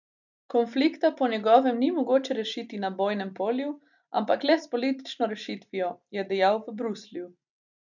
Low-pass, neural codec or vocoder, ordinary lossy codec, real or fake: 7.2 kHz; none; none; real